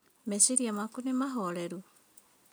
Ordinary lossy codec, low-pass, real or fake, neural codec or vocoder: none; none; real; none